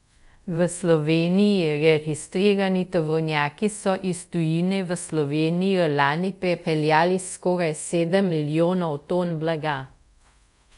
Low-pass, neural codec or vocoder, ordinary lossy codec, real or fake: 10.8 kHz; codec, 24 kHz, 0.5 kbps, DualCodec; none; fake